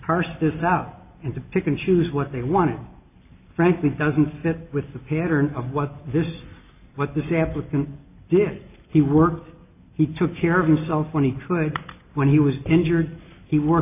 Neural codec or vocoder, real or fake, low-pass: none; real; 3.6 kHz